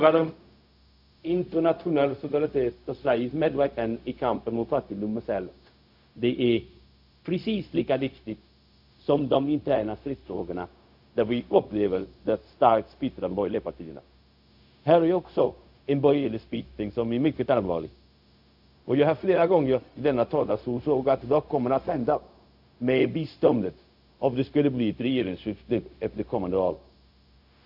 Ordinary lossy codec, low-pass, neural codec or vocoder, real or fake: AAC, 48 kbps; 5.4 kHz; codec, 16 kHz, 0.4 kbps, LongCat-Audio-Codec; fake